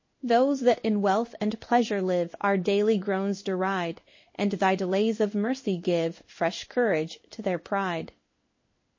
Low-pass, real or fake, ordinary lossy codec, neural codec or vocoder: 7.2 kHz; fake; MP3, 32 kbps; codec, 16 kHz in and 24 kHz out, 1 kbps, XY-Tokenizer